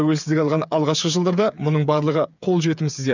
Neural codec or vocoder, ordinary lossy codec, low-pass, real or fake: codec, 16 kHz, 8 kbps, FreqCodec, smaller model; none; 7.2 kHz; fake